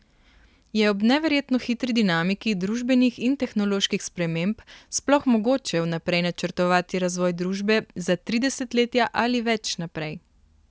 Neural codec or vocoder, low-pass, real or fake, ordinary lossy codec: none; none; real; none